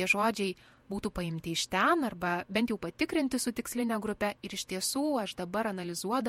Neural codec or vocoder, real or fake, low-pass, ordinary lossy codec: vocoder, 48 kHz, 128 mel bands, Vocos; fake; 19.8 kHz; MP3, 64 kbps